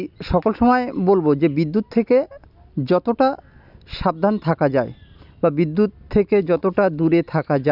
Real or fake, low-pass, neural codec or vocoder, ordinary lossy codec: real; 5.4 kHz; none; none